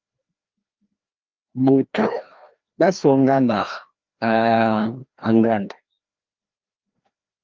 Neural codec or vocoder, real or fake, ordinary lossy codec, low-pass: codec, 16 kHz, 1 kbps, FreqCodec, larger model; fake; Opus, 32 kbps; 7.2 kHz